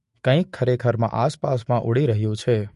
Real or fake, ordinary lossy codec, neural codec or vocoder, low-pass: fake; MP3, 48 kbps; autoencoder, 48 kHz, 128 numbers a frame, DAC-VAE, trained on Japanese speech; 14.4 kHz